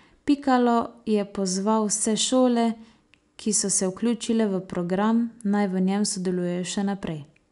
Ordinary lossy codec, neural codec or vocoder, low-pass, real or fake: none; none; 10.8 kHz; real